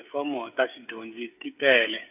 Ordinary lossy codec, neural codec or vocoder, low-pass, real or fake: none; codec, 16 kHz, 8 kbps, FreqCodec, smaller model; 3.6 kHz; fake